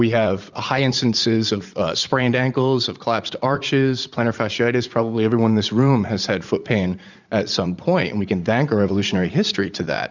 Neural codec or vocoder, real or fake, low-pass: vocoder, 44.1 kHz, 80 mel bands, Vocos; fake; 7.2 kHz